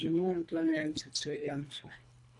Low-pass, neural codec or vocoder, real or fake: 10.8 kHz; codec, 24 kHz, 1.5 kbps, HILCodec; fake